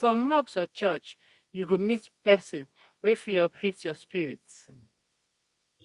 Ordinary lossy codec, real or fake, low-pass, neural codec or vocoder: Opus, 64 kbps; fake; 10.8 kHz; codec, 24 kHz, 0.9 kbps, WavTokenizer, medium music audio release